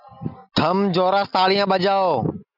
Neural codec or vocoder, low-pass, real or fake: none; 5.4 kHz; real